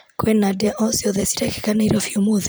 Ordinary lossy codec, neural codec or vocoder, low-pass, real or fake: none; vocoder, 44.1 kHz, 128 mel bands every 256 samples, BigVGAN v2; none; fake